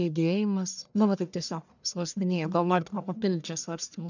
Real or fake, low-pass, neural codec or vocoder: fake; 7.2 kHz; codec, 44.1 kHz, 1.7 kbps, Pupu-Codec